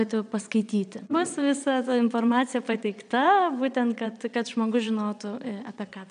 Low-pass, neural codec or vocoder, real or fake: 9.9 kHz; vocoder, 22.05 kHz, 80 mel bands, Vocos; fake